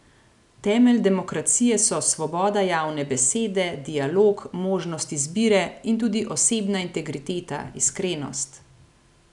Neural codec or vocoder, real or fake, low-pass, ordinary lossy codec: none; real; 10.8 kHz; none